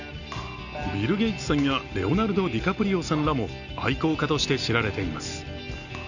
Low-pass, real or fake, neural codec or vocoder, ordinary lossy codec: 7.2 kHz; real; none; none